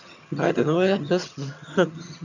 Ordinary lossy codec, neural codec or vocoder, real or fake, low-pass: none; vocoder, 22.05 kHz, 80 mel bands, HiFi-GAN; fake; 7.2 kHz